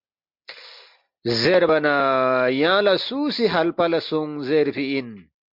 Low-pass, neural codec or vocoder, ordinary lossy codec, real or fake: 5.4 kHz; none; MP3, 48 kbps; real